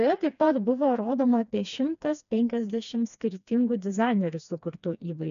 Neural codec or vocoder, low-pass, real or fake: codec, 16 kHz, 2 kbps, FreqCodec, smaller model; 7.2 kHz; fake